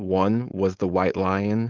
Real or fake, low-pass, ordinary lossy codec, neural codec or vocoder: fake; 7.2 kHz; Opus, 32 kbps; codec, 16 kHz, 4.8 kbps, FACodec